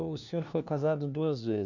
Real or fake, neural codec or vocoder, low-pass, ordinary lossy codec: fake; codec, 16 kHz, 1 kbps, FunCodec, trained on LibriTTS, 50 frames a second; 7.2 kHz; none